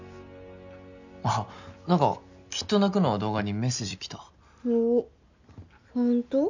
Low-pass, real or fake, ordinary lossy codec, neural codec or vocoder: 7.2 kHz; real; none; none